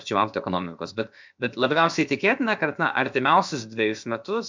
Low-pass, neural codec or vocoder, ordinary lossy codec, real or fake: 7.2 kHz; codec, 16 kHz, about 1 kbps, DyCAST, with the encoder's durations; MP3, 64 kbps; fake